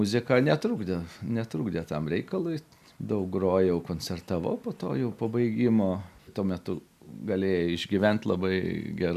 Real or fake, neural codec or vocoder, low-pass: real; none; 14.4 kHz